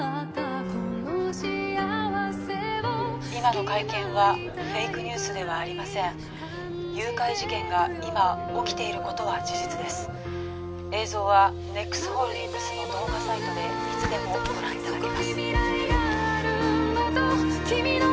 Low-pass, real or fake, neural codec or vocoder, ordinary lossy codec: none; real; none; none